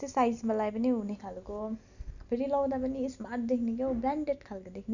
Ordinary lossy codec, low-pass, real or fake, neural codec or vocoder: none; 7.2 kHz; real; none